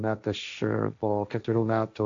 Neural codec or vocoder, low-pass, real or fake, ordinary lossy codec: codec, 16 kHz, 1.1 kbps, Voila-Tokenizer; 7.2 kHz; fake; AAC, 64 kbps